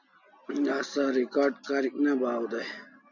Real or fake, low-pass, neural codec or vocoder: real; 7.2 kHz; none